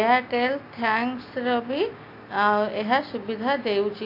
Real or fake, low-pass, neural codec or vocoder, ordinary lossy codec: real; 5.4 kHz; none; AAC, 32 kbps